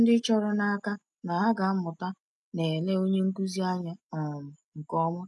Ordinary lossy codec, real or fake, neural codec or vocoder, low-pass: none; real; none; none